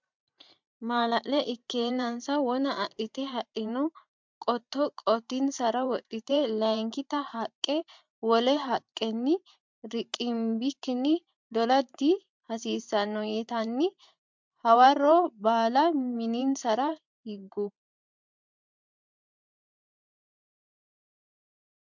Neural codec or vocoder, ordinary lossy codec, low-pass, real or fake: vocoder, 44.1 kHz, 128 mel bands every 512 samples, BigVGAN v2; MP3, 64 kbps; 7.2 kHz; fake